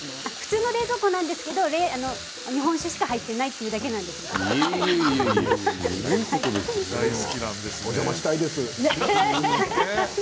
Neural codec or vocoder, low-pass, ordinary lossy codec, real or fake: none; none; none; real